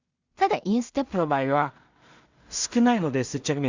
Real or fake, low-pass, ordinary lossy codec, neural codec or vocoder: fake; 7.2 kHz; Opus, 64 kbps; codec, 16 kHz in and 24 kHz out, 0.4 kbps, LongCat-Audio-Codec, two codebook decoder